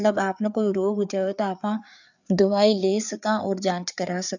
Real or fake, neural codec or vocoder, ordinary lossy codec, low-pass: fake; codec, 16 kHz, 4 kbps, FreqCodec, larger model; none; 7.2 kHz